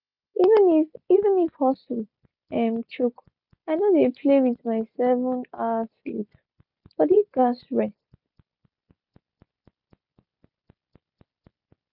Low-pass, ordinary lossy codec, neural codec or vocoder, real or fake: 5.4 kHz; none; none; real